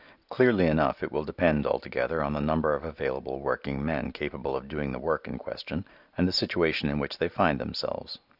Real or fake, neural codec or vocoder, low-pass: real; none; 5.4 kHz